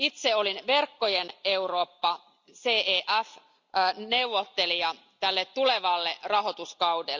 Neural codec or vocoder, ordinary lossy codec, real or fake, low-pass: none; Opus, 64 kbps; real; 7.2 kHz